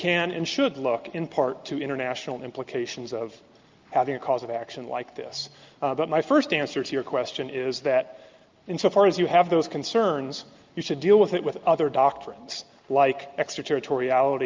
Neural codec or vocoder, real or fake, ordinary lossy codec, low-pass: none; real; Opus, 24 kbps; 7.2 kHz